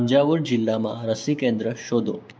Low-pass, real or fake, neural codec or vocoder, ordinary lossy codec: none; fake; codec, 16 kHz, 6 kbps, DAC; none